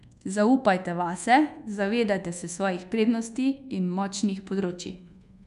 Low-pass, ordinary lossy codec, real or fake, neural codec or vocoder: 10.8 kHz; none; fake; codec, 24 kHz, 1.2 kbps, DualCodec